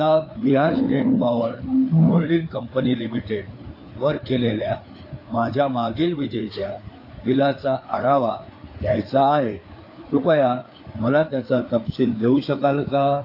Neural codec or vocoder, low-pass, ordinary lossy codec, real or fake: codec, 16 kHz, 4 kbps, FreqCodec, larger model; 5.4 kHz; AAC, 32 kbps; fake